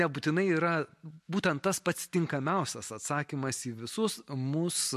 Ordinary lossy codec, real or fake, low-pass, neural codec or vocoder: MP3, 64 kbps; real; 14.4 kHz; none